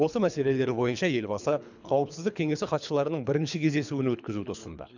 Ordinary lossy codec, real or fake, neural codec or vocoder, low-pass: none; fake; codec, 24 kHz, 3 kbps, HILCodec; 7.2 kHz